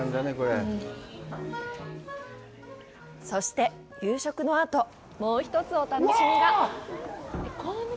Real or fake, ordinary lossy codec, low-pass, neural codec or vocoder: real; none; none; none